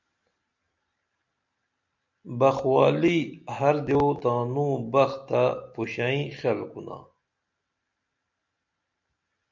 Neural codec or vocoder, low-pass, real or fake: none; 7.2 kHz; real